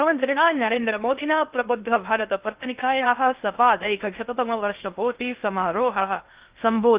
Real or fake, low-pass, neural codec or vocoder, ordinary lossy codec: fake; 3.6 kHz; codec, 16 kHz in and 24 kHz out, 0.6 kbps, FocalCodec, streaming, 2048 codes; Opus, 24 kbps